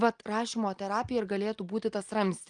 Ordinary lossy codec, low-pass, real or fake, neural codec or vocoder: Opus, 32 kbps; 9.9 kHz; real; none